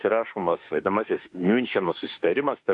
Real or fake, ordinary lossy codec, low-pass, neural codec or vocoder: fake; AAC, 64 kbps; 10.8 kHz; autoencoder, 48 kHz, 32 numbers a frame, DAC-VAE, trained on Japanese speech